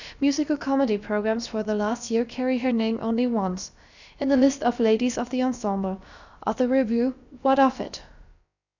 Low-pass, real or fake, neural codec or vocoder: 7.2 kHz; fake; codec, 16 kHz, about 1 kbps, DyCAST, with the encoder's durations